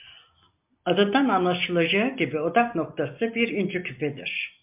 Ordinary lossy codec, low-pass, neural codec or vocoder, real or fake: MP3, 32 kbps; 3.6 kHz; none; real